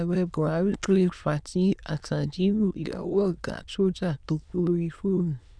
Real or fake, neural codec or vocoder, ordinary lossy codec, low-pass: fake; autoencoder, 22.05 kHz, a latent of 192 numbers a frame, VITS, trained on many speakers; none; 9.9 kHz